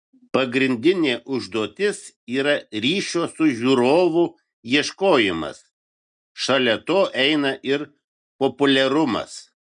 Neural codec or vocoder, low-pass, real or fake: none; 9.9 kHz; real